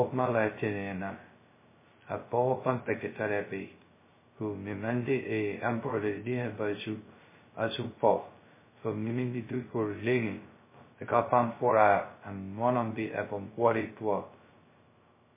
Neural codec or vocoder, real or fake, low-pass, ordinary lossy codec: codec, 16 kHz, 0.2 kbps, FocalCodec; fake; 3.6 kHz; MP3, 16 kbps